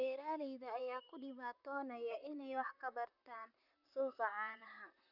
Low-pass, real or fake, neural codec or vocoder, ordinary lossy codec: 5.4 kHz; fake; vocoder, 44.1 kHz, 128 mel bands, Pupu-Vocoder; none